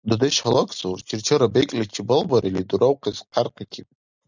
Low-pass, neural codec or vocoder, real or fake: 7.2 kHz; none; real